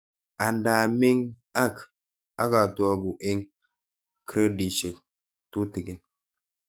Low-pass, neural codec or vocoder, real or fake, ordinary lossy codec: none; codec, 44.1 kHz, 7.8 kbps, DAC; fake; none